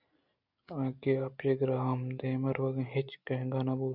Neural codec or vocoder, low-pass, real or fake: none; 5.4 kHz; real